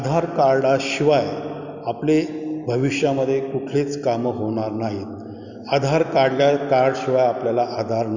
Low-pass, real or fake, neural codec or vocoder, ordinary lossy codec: 7.2 kHz; real; none; none